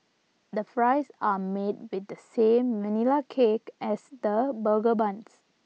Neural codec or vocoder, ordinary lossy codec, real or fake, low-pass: none; none; real; none